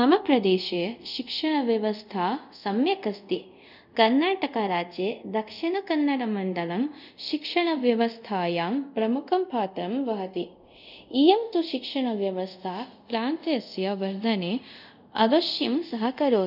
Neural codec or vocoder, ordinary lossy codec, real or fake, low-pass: codec, 24 kHz, 0.5 kbps, DualCodec; none; fake; 5.4 kHz